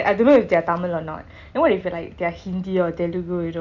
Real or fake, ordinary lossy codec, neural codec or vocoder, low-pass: real; none; none; 7.2 kHz